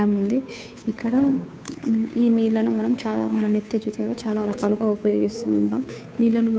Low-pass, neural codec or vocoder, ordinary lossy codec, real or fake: none; codec, 16 kHz, 2 kbps, FunCodec, trained on Chinese and English, 25 frames a second; none; fake